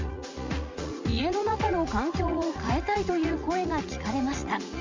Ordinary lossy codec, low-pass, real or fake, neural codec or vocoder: MP3, 64 kbps; 7.2 kHz; fake; vocoder, 44.1 kHz, 80 mel bands, Vocos